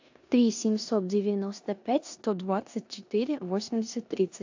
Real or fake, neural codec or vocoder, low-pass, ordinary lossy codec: fake; codec, 16 kHz in and 24 kHz out, 0.9 kbps, LongCat-Audio-Codec, four codebook decoder; 7.2 kHz; AAC, 48 kbps